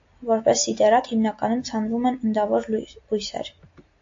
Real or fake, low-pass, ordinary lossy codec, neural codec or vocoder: real; 7.2 kHz; AAC, 48 kbps; none